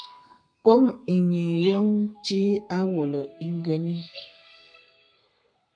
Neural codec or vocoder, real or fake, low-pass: codec, 32 kHz, 1.9 kbps, SNAC; fake; 9.9 kHz